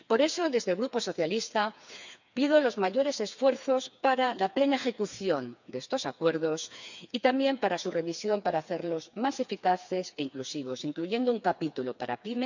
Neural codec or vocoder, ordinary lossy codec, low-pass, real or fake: codec, 16 kHz, 4 kbps, FreqCodec, smaller model; none; 7.2 kHz; fake